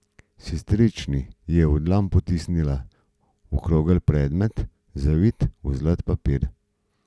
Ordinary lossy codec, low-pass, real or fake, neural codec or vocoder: none; none; real; none